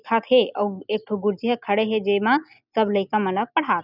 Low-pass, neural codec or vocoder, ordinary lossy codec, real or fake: 5.4 kHz; none; none; real